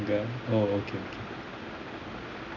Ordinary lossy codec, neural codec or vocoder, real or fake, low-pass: none; none; real; 7.2 kHz